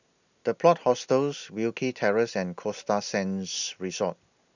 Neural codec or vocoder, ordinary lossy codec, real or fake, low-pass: none; none; real; 7.2 kHz